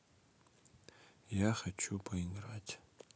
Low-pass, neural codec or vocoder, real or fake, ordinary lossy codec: none; none; real; none